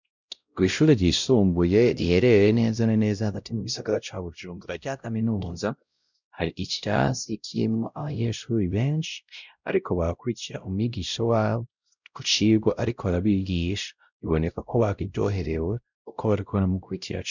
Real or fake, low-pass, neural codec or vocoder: fake; 7.2 kHz; codec, 16 kHz, 0.5 kbps, X-Codec, WavLM features, trained on Multilingual LibriSpeech